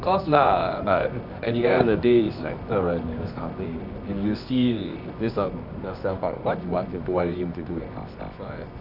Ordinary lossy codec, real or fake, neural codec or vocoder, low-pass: none; fake; codec, 24 kHz, 0.9 kbps, WavTokenizer, medium music audio release; 5.4 kHz